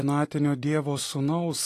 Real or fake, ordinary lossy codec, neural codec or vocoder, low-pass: fake; AAC, 48 kbps; vocoder, 44.1 kHz, 128 mel bands every 256 samples, BigVGAN v2; 14.4 kHz